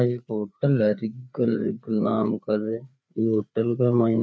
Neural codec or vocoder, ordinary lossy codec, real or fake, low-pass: codec, 16 kHz, 4 kbps, FreqCodec, larger model; none; fake; none